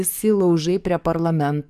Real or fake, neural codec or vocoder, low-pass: fake; codec, 44.1 kHz, 7.8 kbps, DAC; 14.4 kHz